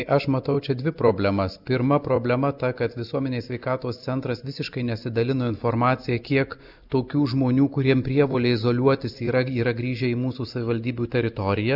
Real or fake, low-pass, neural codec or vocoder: real; 5.4 kHz; none